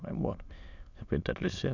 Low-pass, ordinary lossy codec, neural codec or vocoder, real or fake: 7.2 kHz; none; autoencoder, 22.05 kHz, a latent of 192 numbers a frame, VITS, trained on many speakers; fake